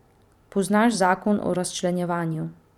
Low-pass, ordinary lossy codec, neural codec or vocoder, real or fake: 19.8 kHz; none; vocoder, 44.1 kHz, 128 mel bands every 512 samples, BigVGAN v2; fake